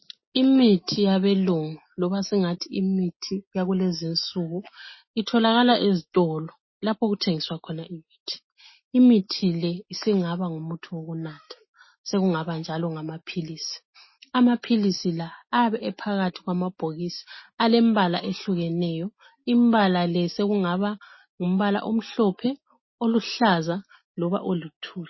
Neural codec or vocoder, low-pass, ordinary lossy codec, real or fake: none; 7.2 kHz; MP3, 24 kbps; real